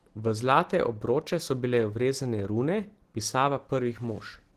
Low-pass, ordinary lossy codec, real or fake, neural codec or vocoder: 14.4 kHz; Opus, 16 kbps; real; none